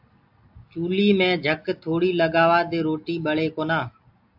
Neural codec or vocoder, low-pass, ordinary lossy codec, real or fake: none; 5.4 kHz; AAC, 48 kbps; real